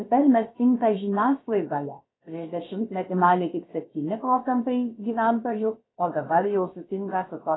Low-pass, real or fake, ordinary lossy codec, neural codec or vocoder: 7.2 kHz; fake; AAC, 16 kbps; codec, 16 kHz, about 1 kbps, DyCAST, with the encoder's durations